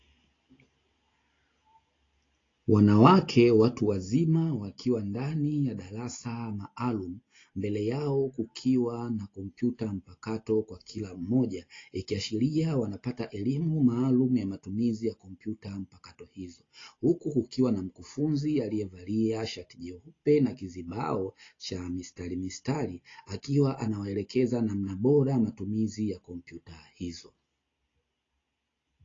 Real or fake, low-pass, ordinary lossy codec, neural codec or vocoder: real; 7.2 kHz; AAC, 32 kbps; none